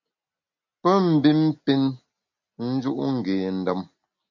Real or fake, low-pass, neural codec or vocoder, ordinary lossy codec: real; 7.2 kHz; none; MP3, 48 kbps